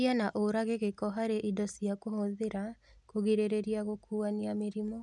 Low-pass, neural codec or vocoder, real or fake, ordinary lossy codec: 10.8 kHz; none; real; none